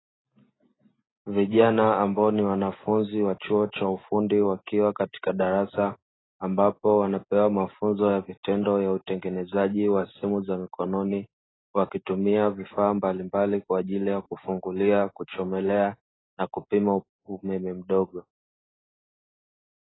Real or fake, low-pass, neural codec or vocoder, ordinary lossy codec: real; 7.2 kHz; none; AAC, 16 kbps